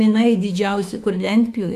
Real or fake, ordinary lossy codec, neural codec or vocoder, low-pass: fake; AAC, 96 kbps; autoencoder, 48 kHz, 32 numbers a frame, DAC-VAE, trained on Japanese speech; 14.4 kHz